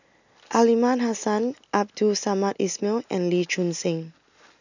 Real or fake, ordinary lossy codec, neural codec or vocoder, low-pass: real; none; none; 7.2 kHz